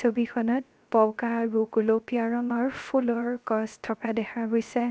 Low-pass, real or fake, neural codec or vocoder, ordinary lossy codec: none; fake; codec, 16 kHz, 0.3 kbps, FocalCodec; none